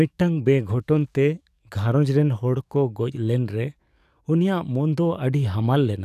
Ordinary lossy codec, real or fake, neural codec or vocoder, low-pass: none; fake; codec, 44.1 kHz, 7.8 kbps, DAC; 14.4 kHz